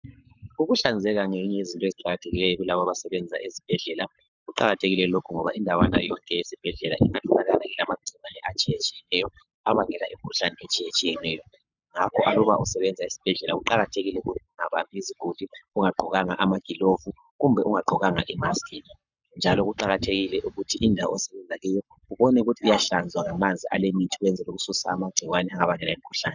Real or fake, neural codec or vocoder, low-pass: fake; codec, 44.1 kHz, 7.8 kbps, Pupu-Codec; 7.2 kHz